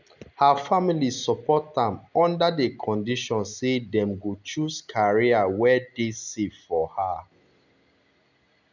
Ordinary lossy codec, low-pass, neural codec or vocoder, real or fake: none; 7.2 kHz; none; real